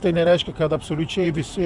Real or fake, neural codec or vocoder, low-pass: fake; vocoder, 44.1 kHz, 128 mel bands, Pupu-Vocoder; 10.8 kHz